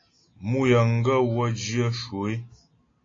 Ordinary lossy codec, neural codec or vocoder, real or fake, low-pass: AAC, 32 kbps; none; real; 7.2 kHz